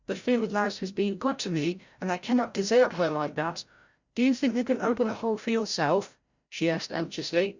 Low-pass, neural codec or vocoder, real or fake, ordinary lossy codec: 7.2 kHz; codec, 16 kHz, 0.5 kbps, FreqCodec, larger model; fake; Opus, 64 kbps